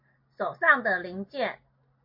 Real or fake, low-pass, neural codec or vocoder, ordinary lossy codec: real; 5.4 kHz; none; MP3, 32 kbps